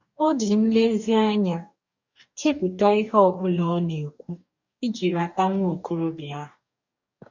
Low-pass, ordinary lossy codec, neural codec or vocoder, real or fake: 7.2 kHz; none; codec, 44.1 kHz, 2.6 kbps, DAC; fake